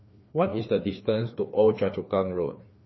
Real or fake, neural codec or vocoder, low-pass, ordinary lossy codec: fake; codec, 16 kHz, 2 kbps, FreqCodec, larger model; 7.2 kHz; MP3, 24 kbps